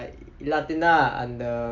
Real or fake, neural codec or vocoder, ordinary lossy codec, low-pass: real; none; none; 7.2 kHz